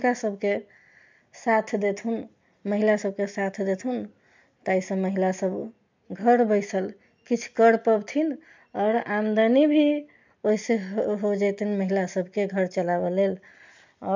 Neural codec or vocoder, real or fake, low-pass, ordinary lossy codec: none; real; 7.2 kHz; MP3, 64 kbps